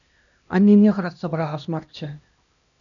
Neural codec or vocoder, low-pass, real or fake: codec, 16 kHz, 1 kbps, X-Codec, HuBERT features, trained on LibriSpeech; 7.2 kHz; fake